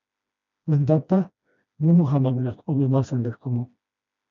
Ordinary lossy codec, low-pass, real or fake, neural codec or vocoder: MP3, 96 kbps; 7.2 kHz; fake; codec, 16 kHz, 1 kbps, FreqCodec, smaller model